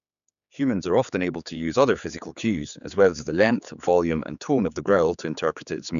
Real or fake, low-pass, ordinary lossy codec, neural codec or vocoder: fake; 7.2 kHz; none; codec, 16 kHz, 4 kbps, X-Codec, HuBERT features, trained on general audio